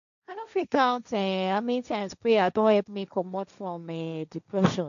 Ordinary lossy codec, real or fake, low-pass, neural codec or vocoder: none; fake; 7.2 kHz; codec, 16 kHz, 1.1 kbps, Voila-Tokenizer